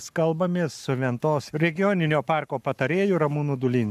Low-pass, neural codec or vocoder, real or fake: 14.4 kHz; codec, 44.1 kHz, 7.8 kbps, Pupu-Codec; fake